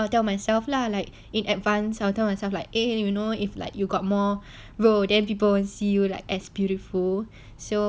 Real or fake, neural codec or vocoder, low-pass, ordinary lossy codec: real; none; none; none